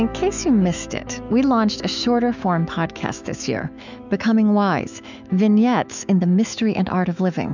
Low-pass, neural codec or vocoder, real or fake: 7.2 kHz; autoencoder, 48 kHz, 128 numbers a frame, DAC-VAE, trained on Japanese speech; fake